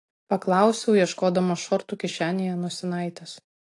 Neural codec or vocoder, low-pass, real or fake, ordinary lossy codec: none; 10.8 kHz; real; AAC, 48 kbps